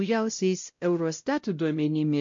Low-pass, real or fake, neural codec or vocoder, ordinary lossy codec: 7.2 kHz; fake; codec, 16 kHz, 0.5 kbps, X-Codec, WavLM features, trained on Multilingual LibriSpeech; MP3, 48 kbps